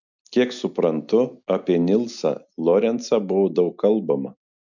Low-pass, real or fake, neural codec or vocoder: 7.2 kHz; real; none